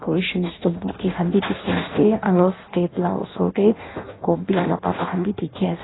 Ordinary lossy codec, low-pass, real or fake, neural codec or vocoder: AAC, 16 kbps; 7.2 kHz; fake; codec, 16 kHz in and 24 kHz out, 0.6 kbps, FireRedTTS-2 codec